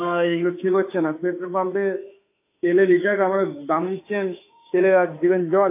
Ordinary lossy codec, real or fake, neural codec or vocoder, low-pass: AAC, 24 kbps; fake; autoencoder, 48 kHz, 32 numbers a frame, DAC-VAE, trained on Japanese speech; 3.6 kHz